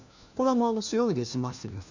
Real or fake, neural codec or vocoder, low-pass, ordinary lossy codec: fake; codec, 16 kHz, 1 kbps, FunCodec, trained on LibriTTS, 50 frames a second; 7.2 kHz; none